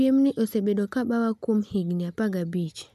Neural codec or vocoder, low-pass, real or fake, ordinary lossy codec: none; 14.4 kHz; real; MP3, 96 kbps